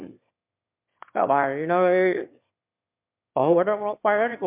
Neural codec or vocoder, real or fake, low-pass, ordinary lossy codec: autoencoder, 22.05 kHz, a latent of 192 numbers a frame, VITS, trained on one speaker; fake; 3.6 kHz; MP3, 32 kbps